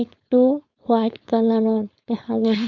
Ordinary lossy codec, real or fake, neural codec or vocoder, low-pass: Opus, 64 kbps; fake; codec, 16 kHz, 4.8 kbps, FACodec; 7.2 kHz